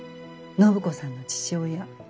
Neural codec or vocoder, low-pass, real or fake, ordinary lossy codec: none; none; real; none